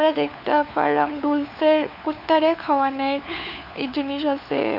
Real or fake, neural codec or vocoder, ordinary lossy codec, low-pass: fake; codec, 16 kHz, 4 kbps, FunCodec, trained on LibriTTS, 50 frames a second; none; 5.4 kHz